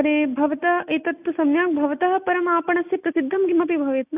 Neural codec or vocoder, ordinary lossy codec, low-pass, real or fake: none; none; 3.6 kHz; real